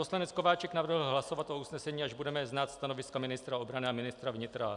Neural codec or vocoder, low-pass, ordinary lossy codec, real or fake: none; 10.8 kHz; MP3, 96 kbps; real